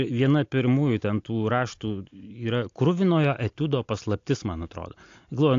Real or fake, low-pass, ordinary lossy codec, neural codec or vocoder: real; 7.2 kHz; AAC, 48 kbps; none